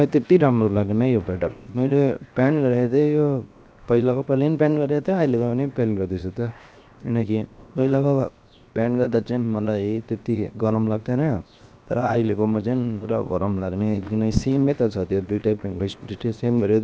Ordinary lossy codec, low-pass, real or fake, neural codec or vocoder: none; none; fake; codec, 16 kHz, 0.7 kbps, FocalCodec